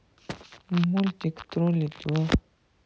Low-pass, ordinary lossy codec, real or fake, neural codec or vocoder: none; none; real; none